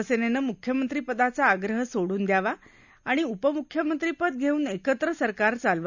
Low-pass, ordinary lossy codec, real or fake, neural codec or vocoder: 7.2 kHz; none; real; none